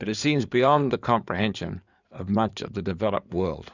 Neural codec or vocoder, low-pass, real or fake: codec, 16 kHz in and 24 kHz out, 2.2 kbps, FireRedTTS-2 codec; 7.2 kHz; fake